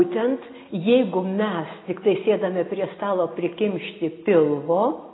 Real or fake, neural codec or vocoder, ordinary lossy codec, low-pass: real; none; AAC, 16 kbps; 7.2 kHz